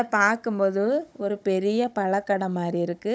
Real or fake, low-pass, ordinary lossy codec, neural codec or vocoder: fake; none; none; codec, 16 kHz, 16 kbps, FunCodec, trained on Chinese and English, 50 frames a second